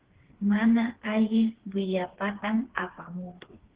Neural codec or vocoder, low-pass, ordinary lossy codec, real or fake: codec, 16 kHz, 4 kbps, FreqCodec, smaller model; 3.6 kHz; Opus, 16 kbps; fake